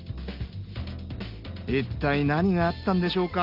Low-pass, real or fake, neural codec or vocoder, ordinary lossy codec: 5.4 kHz; real; none; Opus, 24 kbps